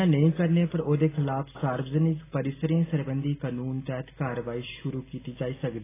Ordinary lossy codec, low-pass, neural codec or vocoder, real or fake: AAC, 16 kbps; 3.6 kHz; none; real